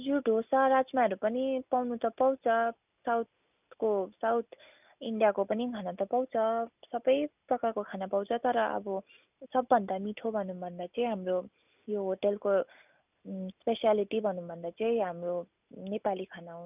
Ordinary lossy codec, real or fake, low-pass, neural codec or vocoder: none; real; 3.6 kHz; none